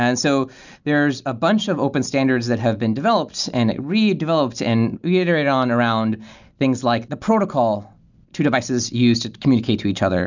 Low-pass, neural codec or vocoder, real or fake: 7.2 kHz; none; real